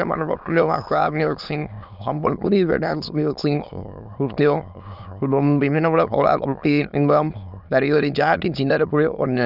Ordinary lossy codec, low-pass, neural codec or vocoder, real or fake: none; 5.4 kHz; autoencoder, 22.05 kHz, a latent of 192 numbers a frame, VITS, trained on many speakers; fake